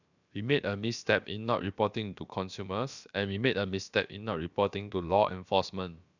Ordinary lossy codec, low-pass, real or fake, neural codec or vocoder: none; 7.2 kHz; fake; codec, 16 kHz, about 1 kbps, DyCAST, with the encoder's durations